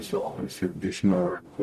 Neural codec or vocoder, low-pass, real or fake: codec, 44.1 kHz, 0.9 kbps, DAC; 14.4 kHz; fake